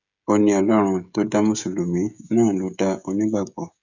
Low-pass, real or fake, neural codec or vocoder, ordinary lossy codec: 7.2 kHz; fake; codec, 16 kHz, 16 kbps, FreqCodec, smaller model; none